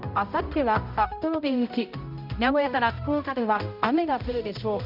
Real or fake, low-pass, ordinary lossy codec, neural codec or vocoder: fake; 5.4 kHz; none; codec, 16 kHz, 0.5 kbps, X-Codec, HuBERT features, trained on balanced general audio